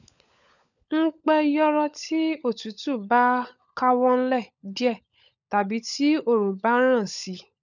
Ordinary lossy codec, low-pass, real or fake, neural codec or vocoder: none; 7.2 kHz; fake; codec, 16 kHz, 16 kbps, FunCodec, trained on LibriTTS, 50 frames a second